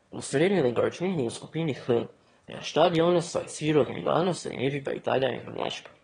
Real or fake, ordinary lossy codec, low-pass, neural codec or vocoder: fake; AAC, 32 kbps; 9.9 kHz; autoencoder, 22.05 kHz, a latent of 192 numbers a frame, VITS, trained on one speaker